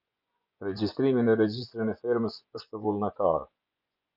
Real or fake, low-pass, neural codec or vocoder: fake; 5.4 kHz; vocoder, 24 kHz, 100 mel bands, Vocos